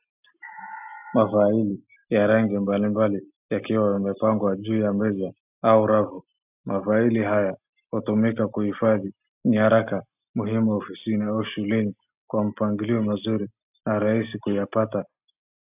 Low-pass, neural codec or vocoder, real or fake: 3.6 kHz; none; real